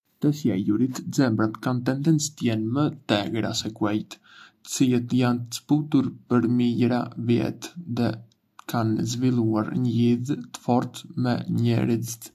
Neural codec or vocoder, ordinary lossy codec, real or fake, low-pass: none; AAC, 96 kbps; real; 14.4 kHz